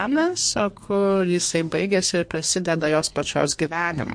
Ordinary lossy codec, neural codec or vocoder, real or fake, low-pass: MP3, 48 kbps; codec, 32 kHz, 1.9 kbps, SNAC; fake; 9.9 kHz